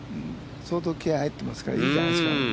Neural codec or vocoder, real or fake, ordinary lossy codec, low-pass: none; real; none; none